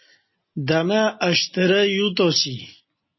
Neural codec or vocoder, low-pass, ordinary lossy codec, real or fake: none; 7.2 kHz; MP3, 24 kbps; real